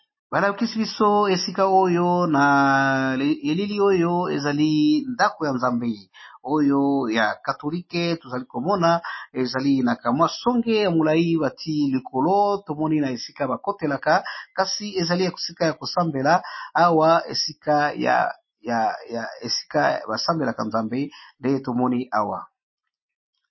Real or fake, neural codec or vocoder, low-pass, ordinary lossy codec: real; none; 7.2 kHz; MP3, 24 kbps